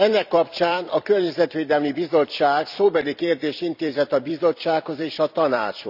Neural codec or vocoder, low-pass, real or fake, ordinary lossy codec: vocoder, 44.1 kHz, 128 mel bands every 256 samples, BigVGAN v2; 5.4 kHz; fake; none